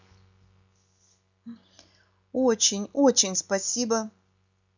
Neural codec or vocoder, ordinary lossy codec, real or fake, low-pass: none; none; real; 7.2 kHz